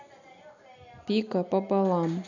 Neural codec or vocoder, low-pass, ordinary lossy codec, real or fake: none; 7.2 kHz; none; real